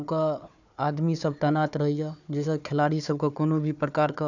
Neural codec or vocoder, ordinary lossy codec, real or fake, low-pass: codec, 16 kHz, 4 kbps, FunCodec, trained on Chinese and English, 50 frames a second; none; fake; 7.2 kHz